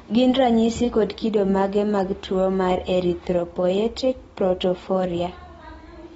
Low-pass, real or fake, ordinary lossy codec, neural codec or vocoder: 19.8 kHz; real; AAC, 24 kbps; none